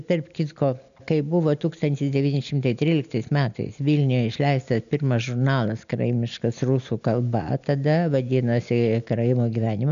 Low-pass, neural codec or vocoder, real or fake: 7.2 kHz; none; real